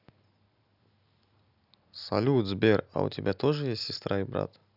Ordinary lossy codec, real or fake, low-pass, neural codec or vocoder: none; real; 5.4 kHz; none